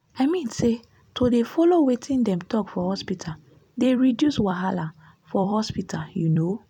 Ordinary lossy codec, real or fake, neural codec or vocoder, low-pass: none; fake; vocoder, 44.1 kHz, 128 mel bands every 256 samples, BigVGAN v2; 19.8 kHz